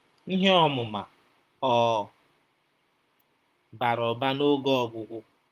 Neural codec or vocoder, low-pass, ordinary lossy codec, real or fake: vocoder, 44.1 kHz, 128 mel bands, Pupu-Vocoder; 14.4 kHz; Opus, 32 kbps; fake